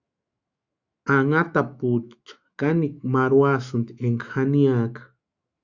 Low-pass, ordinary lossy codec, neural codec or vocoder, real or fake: 7.2 kHz; Opus, 64 kbps; autoencoder, 48 kHz, 128 numbers a frame, DAC-VAE, trained on Japanese speech; fake